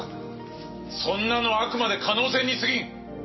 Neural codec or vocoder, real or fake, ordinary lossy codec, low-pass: none; real; MP3, 24 kbps; 7.2 kHz